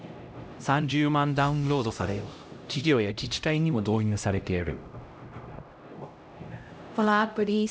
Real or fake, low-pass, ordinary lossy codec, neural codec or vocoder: fake; none; none; codec, 16 kHz, 0.5 kbps, X-Codec, HuBERT features, trained on LibriSpeech